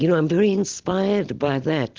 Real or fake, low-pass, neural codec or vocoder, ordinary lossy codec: real; 7.2 kHz; none; Opus, 16 kbps